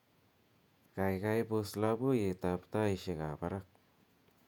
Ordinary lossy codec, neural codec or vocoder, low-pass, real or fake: none; vocoder, 48 kHz, 128 mel bands, Vocos; 19.8 kHz; fake